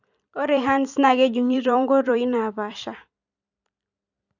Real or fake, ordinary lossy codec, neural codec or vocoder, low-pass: fake; none; vocoder, 44.1 kHz, 128 mel bands every 256 samples, BigVGAN v2; 7.2 kHz